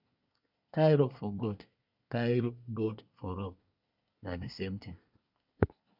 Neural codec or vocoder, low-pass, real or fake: codec, 24 kHz, 1 kbps, SNAC; 5.4 kHz; fake